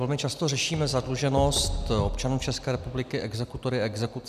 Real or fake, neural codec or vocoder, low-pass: real; none; 14.4 kHz